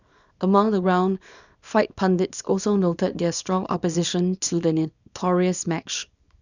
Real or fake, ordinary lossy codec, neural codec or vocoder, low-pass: fake; none; codec, 24 kHz, 0.9 kbps, WavTokenizer, small release; 7.2 kHz